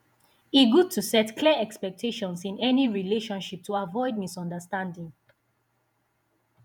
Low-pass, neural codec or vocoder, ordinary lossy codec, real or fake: 19.8 kHz; vocoder, 48 kHz, 128 mel bands, Vocos; none; fake